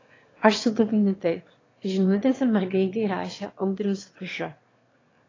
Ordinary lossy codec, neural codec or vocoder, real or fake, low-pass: AAC, 32 kbps; autoencoder, 22.05 kHz, a latent of 192 numbers a frame, VITS, trained on one speaker; fake; 7.2 kHz